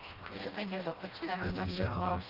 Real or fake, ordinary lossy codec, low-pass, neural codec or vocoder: fake; Opus, 24 kbps; 5.4 kHz; codec, 16 kHz, 1 kbps, FreqCodec, smaller model